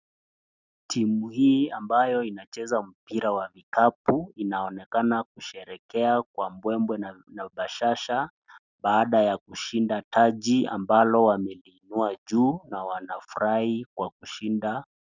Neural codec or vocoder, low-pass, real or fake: none; 7.2 kHz; real